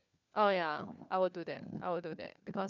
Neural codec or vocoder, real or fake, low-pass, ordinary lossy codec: codec, 16 kHz, 4 kbps, FunCodec, trained on LibriTTS, 50 frames a second; fake; 7.2 kHz; none